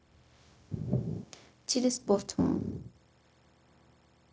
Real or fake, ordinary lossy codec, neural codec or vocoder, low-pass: fake; none; codec, 16 kHz, 0.4 kbps, LongCat-Audio-Codec; none